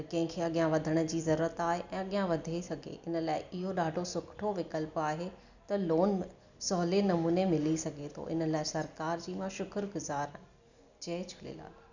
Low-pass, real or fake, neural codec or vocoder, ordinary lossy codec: 7.2 kHz; real; none; none